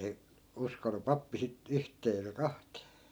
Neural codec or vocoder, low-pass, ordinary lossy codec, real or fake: none; none; none; real